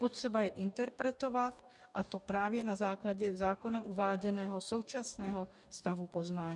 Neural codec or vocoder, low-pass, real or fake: codec, 44.1 kHz, 2.6 kbps, DAC; 10.8 kHz; fake